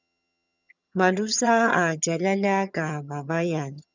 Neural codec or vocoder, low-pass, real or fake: vocoder, 22.05 kHz, 80 mel bands, HiFi-GAN; 7.2 kHz; fake